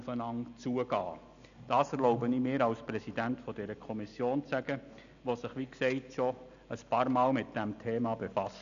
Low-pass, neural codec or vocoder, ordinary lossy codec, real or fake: 7.2 kHz; none; none; real